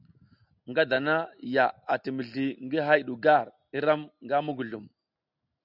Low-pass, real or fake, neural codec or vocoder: 5.4 kHz; real; none